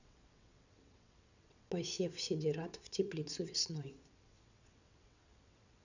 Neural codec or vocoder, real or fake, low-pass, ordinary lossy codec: vocoder, 22.05 kHz, 80 mel bands, WaveNeXt; fake; 7.2 kHz; none